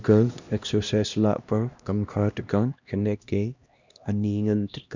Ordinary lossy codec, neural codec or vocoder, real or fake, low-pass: Opus, 64 kbps; codec, 16 kHz, 1 kbps, X-Codec, HuBERT features, trained on LibriSpeech; fake; 7.2 kHz